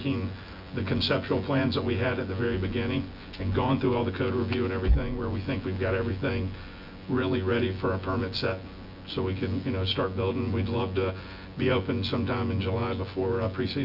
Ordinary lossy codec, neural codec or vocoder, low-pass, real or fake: Opus, 64 kbps; vocoder, 24 kHz, 100 mel bands, Vocos; 5.4 kHz; fake